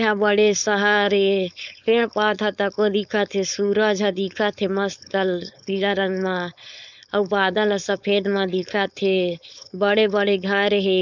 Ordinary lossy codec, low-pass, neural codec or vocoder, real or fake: none; 7.2 kHz; codec, 16 kHz, 4.8 kbps, FACodec; fake